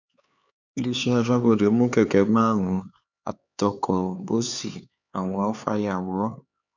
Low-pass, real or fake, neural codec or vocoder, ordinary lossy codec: 7.2 kHz; fake; codec, 16 kHz, 4 kbps, X-Codec, HuBERT features, trained on LibriSpeech; none